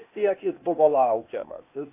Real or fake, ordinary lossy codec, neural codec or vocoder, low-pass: fake; AAC, 24 kbps; codec, 16 kHz, 0.8 kbps, ZipCodec; 3.6 kHz